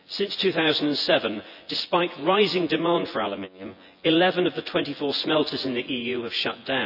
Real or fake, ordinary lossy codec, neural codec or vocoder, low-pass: fake; none; vocoder, 24 kHz, 100 mel bands, Vocos; 5.4 kHz